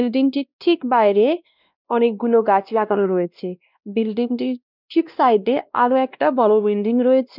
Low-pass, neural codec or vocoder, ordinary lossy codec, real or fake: 5.4 kHz; codec, 16 kHz, 1 kbps, X-Codec, WavLM features, trained on Multilingual LibriSpeech; none; fake